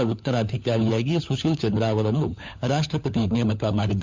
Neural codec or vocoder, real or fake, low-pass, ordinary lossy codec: codec, 16 kHz, 4 kbps, FunCodec, trained on LibriTTS, 50 frames a second; fake; 7.2 kHz; MP3, 64 kbps